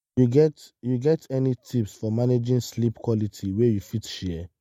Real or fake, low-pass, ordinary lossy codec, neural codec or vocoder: real; 19.8 kHz; MP3, 64 kbps; none